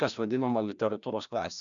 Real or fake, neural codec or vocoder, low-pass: fake; codec, 16 kHz, 1 kbps, FreqCodec, larger model; 7.2 kHz